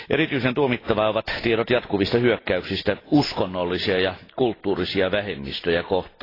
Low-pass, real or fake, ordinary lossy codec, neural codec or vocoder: 5.4 kHz; real; AAC, 24 kbps; none